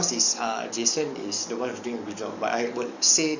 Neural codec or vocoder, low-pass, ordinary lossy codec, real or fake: codec, 44.1 kHz, 7.8 kbps, DAC; 7.2 kHz; none; fake